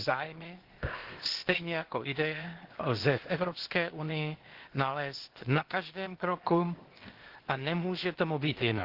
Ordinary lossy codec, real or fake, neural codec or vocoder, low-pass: Opus, 16 kbps; fake; codec, 16 kHz, 0.8 kbps, ZipCodec; 5.4 kHz